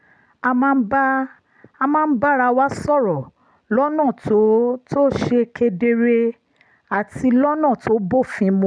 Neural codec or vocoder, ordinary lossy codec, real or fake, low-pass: none; none; real; 9.9 kHz